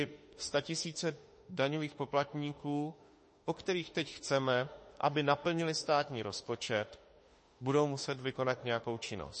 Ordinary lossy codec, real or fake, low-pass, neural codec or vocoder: MP3, 32 kbps; fake; 10.8 kHz; autoencoder, 48 kHz, 32 numbers a frame, DAC-VAE, trained on Japanese speech